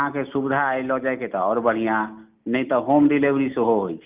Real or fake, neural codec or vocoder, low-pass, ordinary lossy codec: real; none; 3.6 kHz; Opus, 24 kbps